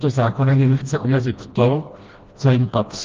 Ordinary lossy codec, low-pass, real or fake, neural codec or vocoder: Opus, 24 kbps; 7.2 kHz; fake; codec, 16 kHz, 1 kbps, FreqCodec, smaller model